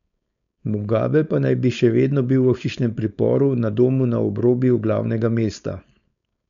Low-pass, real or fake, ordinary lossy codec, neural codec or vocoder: 7.2 kHz; fake; none; codec, 16 kHz, 4.8 kbps, FACodec